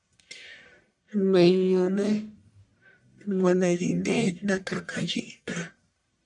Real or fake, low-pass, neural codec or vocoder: fake; 10.8 kHz; codec, 44.1 kHz, 1.7 kbps, Pupu-Codec